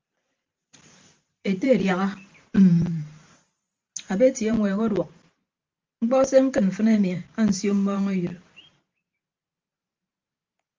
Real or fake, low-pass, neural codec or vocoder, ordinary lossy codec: fake; 7.2 kHz; vocoder, 44.1 kHz, 128 mel bands every 512 samples, BigVGAN v2; Opus, 32 kbps